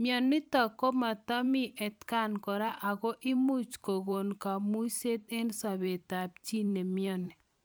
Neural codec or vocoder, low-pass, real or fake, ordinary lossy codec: vocoder, 44.1 kHz, 128 mel bands every 512 samples, BigVGAN v2; none; fake; none